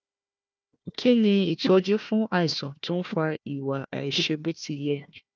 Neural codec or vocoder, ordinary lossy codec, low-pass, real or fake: codec, 16 kHz, 1 kbps, FunCodec, trained on Chinese and English, 50 frames a second; none; none; fake